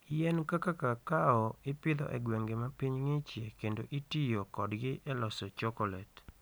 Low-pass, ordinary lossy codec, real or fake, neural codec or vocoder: none; none; real; none